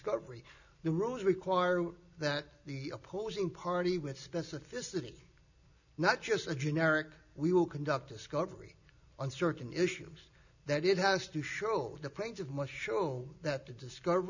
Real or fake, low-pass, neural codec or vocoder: real; 7.2 kHz; none